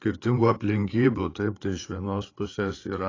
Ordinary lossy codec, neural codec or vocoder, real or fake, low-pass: AAC, 32 kbps; codec, 16 kHz, 8 kbps, FreqCodec, larger model; fake; 7.2 kHz